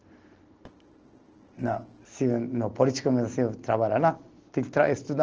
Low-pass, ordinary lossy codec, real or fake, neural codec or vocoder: 7.2 kHz; Opus, 16 kbps; real; none